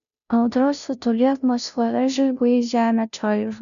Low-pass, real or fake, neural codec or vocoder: 7.2 kHz; fake; codec, 16 kHz, 0.5 kbps, FunCodec, trained on Chinese and English, 25 frames a second